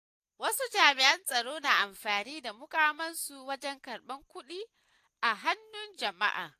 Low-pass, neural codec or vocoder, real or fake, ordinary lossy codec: 14.4 kHz; none; real; AAC, 64 kbps